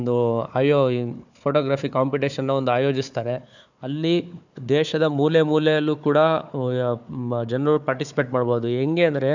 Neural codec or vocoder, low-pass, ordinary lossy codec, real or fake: codec, 16 kHz, 4 kbps, FunCodec, trained on Chinese and English, 50 frames a second; 7.2 kHz; none; fake